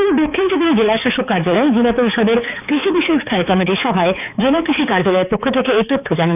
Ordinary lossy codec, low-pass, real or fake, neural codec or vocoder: none; 3.6 kHz; fake; codec, 16 kHz, 4 kbps, X-Codec, HuBERT features, trained on balanced general audio